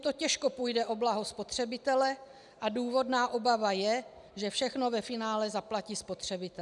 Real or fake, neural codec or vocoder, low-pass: real; none; 10.8 kHz